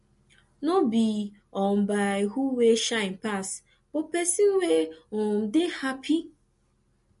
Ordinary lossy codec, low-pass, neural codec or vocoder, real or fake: MP3, 48 kbps; 14.4 kHz; none; real